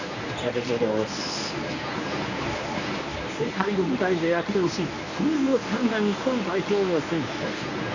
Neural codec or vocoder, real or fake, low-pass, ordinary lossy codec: codec, 24 kHz, 0.9 kbps, WavTokenizer, medium speech release version 1; fake; 7.2 kHz; none